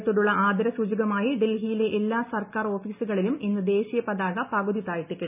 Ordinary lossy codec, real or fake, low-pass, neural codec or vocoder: none; real; 3.6 kHz; none